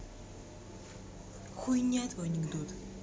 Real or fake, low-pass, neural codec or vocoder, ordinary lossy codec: real; none; none; none